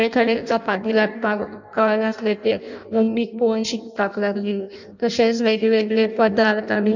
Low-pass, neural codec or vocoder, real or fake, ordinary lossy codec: 7.2 kHz; codec, 16 kHz in and 24 kHz out, 0.6 kbps, FireRedTTS-2 codec; fake; none